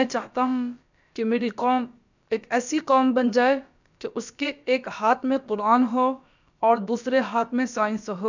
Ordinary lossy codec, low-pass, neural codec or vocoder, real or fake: none; 7.2 kHz; codec, 16 kHz, about 1 kbps, DyCAST, with the encoder's durations; fake